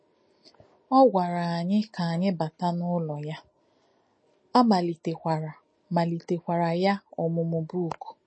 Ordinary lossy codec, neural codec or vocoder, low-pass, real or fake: MP3, 32 kbps; none; 9.9 kHz; real